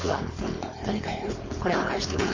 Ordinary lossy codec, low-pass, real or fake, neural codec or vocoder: MP3, 32 kbps; 7.2 kHz; fake; codec, 16 kHz, 4.8 kbps, FACodec